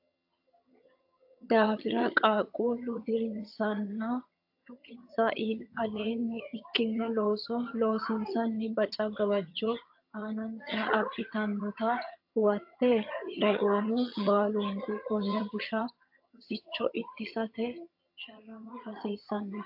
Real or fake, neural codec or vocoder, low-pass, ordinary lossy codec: fake; vocoder, 22.05 kHz, 80 mel bands, HiFi-GAN; 5.4 kHz; AAC, 48 kbps